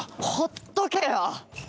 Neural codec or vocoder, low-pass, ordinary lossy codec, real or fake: none; none; none; real